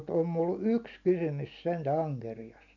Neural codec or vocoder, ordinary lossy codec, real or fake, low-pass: none; none; real; 7.2 kHz